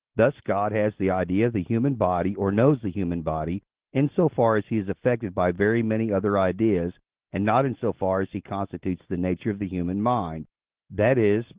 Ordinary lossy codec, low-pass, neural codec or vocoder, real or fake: Opus, 16 kbps; 3.6 kHz; none; real